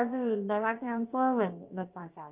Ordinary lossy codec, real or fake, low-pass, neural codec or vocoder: Opus, 24 kbps; fake; 3.6 kHz; codec, 16 kHz, about 1 kbps, DyCAST, with the encoder's durations